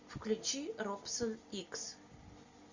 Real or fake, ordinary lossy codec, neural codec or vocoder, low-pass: real; Opus, 64 kbps; none; 7.2 kHz